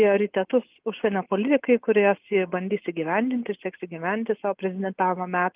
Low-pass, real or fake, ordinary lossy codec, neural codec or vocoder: 3.6 kHz; real; Opus, 32 kbps; none